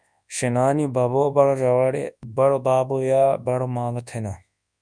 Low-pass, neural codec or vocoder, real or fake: 9.9 kHz; codec, 24 kHz, 0.9 kbps, WavTokenizer, large speech release; fake